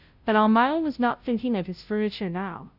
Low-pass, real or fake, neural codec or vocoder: 5.4 kHz; fake; codec, 16 kHz, 0.5 kbps, FunCodec, trained on Chinese and English, 25 frames a second